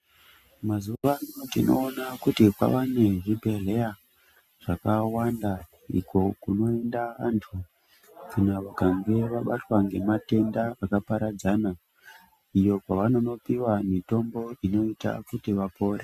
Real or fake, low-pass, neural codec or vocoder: real; 14.4 kHz; none